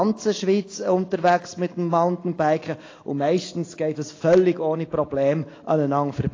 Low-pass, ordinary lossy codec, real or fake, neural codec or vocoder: 7.2 kHz; AAC, 32 kbps; real; none